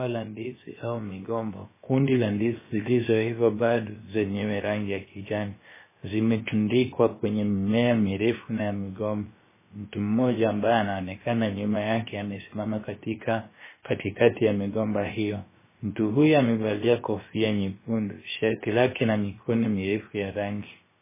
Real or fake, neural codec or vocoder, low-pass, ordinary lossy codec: fake; codec, 16 kHz, about 1 kbps, DyCAST, with the encoder's durations; 3.6 kHz; MP3, 16 kbps